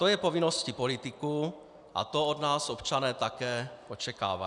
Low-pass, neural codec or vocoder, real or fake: 10.8 kHz; none; real